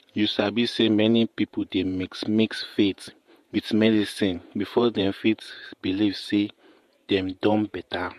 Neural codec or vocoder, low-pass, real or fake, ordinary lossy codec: vocoder, 48 kHz, 128 mel bands, Vocos; 14.4 kHz; fake; MP3, 64 kbps